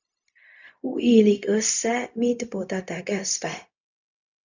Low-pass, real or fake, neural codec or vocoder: 7.2 kHz; fake; codec, 16 kHz, 0.4 kbps, LongCat-Audio-Codec